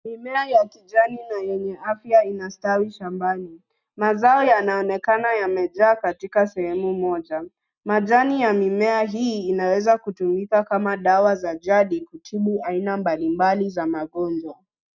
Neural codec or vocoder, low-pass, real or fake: none; 7.2 kHz; real